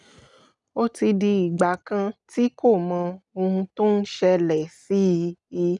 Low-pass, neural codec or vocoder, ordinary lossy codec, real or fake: 10.8 kHz; none; none; real